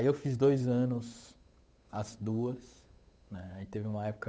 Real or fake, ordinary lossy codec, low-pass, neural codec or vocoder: fake; none; none; codec, 16 kHz, 8 kbps, FunCodec, trained on Chinese and English, 25 frames a second